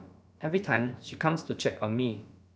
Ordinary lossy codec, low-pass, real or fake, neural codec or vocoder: none; none; fake; codec, 16 kHz, about 1 kbps, DyCAST, with the encoder's durations